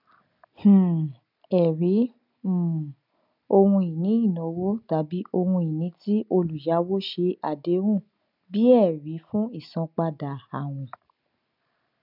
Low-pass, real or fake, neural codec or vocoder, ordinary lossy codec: 5.4 kHz; real; none; none